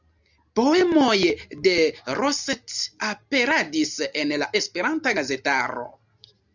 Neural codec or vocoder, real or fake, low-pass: none; real; 7.2 kHz